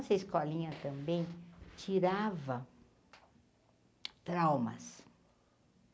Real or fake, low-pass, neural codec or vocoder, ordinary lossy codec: real; none; none; none